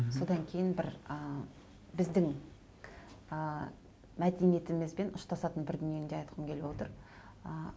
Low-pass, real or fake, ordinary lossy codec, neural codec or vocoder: none; real; none; none